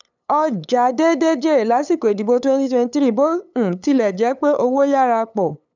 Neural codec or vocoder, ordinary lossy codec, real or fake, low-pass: codec, 16 kHz, 8 kbps, FunCodec, trained on LibriTTS, 25 frames a second; none; fake; 7.2 kHz